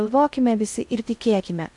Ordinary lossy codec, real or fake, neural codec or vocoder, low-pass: MP3, 96 kbps; fake; codec, 16 kHz in and 24 kHz out, 0.6 kbps, FocalCodec, streaming, 2048 codes; 10.8 kHz